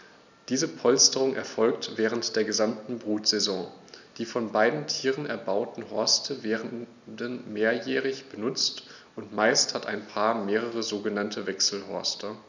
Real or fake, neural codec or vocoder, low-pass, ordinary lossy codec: real; none; 7.2 kHz; none